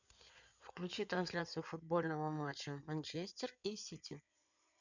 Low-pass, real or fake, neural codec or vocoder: 7.2 kHz; fake; codec, 16 kHz in and 24 kHz out, 2.2 kbps, FireRedTTS-2 codec